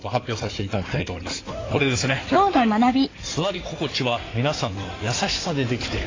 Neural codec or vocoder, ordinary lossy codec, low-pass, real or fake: codec, 16 kHz in and 24 kHz out, 2.2 kbps, FireRedTTS-2 codec; AAC, 32 kbps; 7.2 kHz; fake